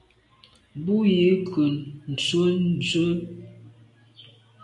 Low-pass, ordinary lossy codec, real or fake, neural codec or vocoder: 10.8 kHz; AAC, 48 kbps; real; none